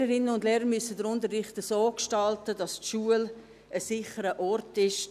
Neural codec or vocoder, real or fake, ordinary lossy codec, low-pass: none; real; none; 14.4 kHz